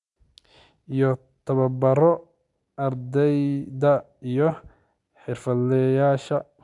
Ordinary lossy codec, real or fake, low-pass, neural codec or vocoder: none; real; 10.8 kHz; none